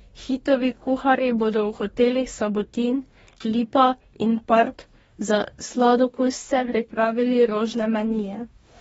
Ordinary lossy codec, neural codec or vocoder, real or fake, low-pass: AAC, 24 kbps; codec, 44.1 kHz, 2.6 kbps, DAC; fake; 19.8 kHz